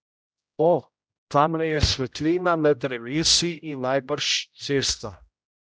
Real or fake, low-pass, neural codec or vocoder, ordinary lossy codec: fake; none; codec, 16 kHz, 0.5 kbps, X-Codec, HuBERT features, trained on general audio; none